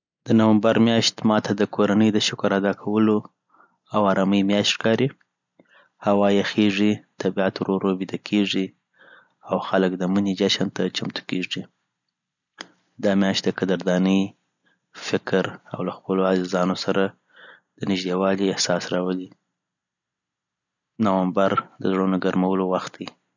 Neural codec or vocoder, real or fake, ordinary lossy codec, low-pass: none; real; none; 7.2 kHz